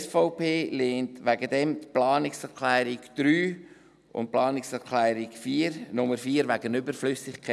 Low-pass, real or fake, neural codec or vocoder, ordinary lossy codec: none; real; none; none